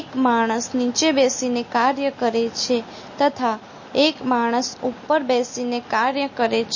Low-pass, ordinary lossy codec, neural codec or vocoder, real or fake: 7.2 kHz; MP3, 32 kbps; none; real